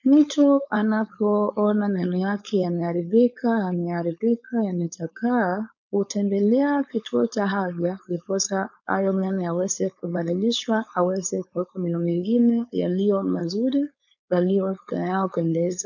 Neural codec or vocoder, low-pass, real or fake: codec, 16 kHz, 4.8 kbps, FACodec; 7.2 kHz; fake